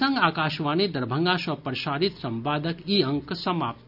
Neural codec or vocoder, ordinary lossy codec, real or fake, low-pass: none; none; real; 5.4 kHz